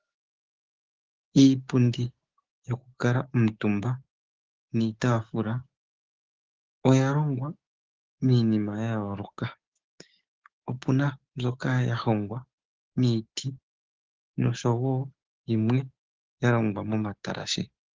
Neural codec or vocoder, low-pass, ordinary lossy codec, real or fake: codec, 16 kHz, 6 kbps, DAC; 7.2 kHz; Opus, 16 kbps; fake